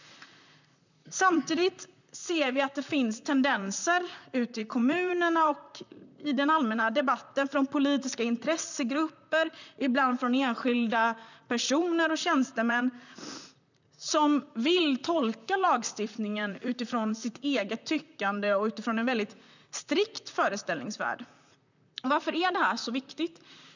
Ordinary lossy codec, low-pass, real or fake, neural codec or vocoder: none; 7.2 kHz; fake; vocoder, 44.1 kHz, 128 mel bands, Pupu-Vocoder